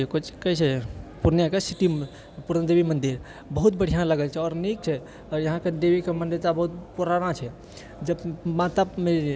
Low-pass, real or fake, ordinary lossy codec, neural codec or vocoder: none; real; none; none